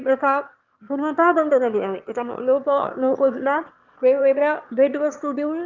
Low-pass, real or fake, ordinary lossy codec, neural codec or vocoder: 7.2 kHz; fake; Opus, 32 kbps; autoencoder, 22.05 kHz, a latent of 192 numbers a frame, VITS, trained on one speaker